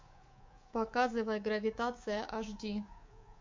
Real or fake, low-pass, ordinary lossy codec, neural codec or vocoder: fake; 7.2 kHz; MP3, 48 kbps; codec, 24 kHz, 3.1 kbps, DualCodec